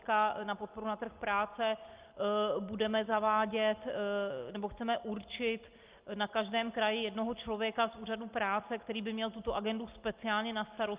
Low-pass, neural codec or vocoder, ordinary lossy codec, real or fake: 3.6 kHz; none; Opus, 24 kbps; real